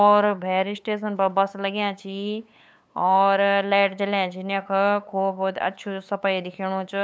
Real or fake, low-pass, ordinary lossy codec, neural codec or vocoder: fake; none; none; codec, 16 kHz, 8 kbps, FunCodec, trained on LibriTTS, 25 frames a second